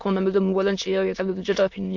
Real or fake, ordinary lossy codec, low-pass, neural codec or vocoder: fake; MP3, 48 kbps; 7.2 kHz; autoencoder, 22.05 kHz, a latent of 192 numbers a frame, VITS, trained on many speakers